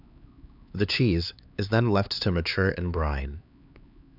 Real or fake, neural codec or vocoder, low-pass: fake; codec, 16 kHz, 4 kbps, X-Codec, HuBERT features, trained on LibriSpeech; 5.4 kHz